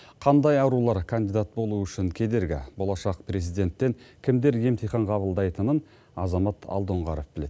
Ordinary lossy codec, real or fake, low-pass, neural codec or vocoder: none; real; none; none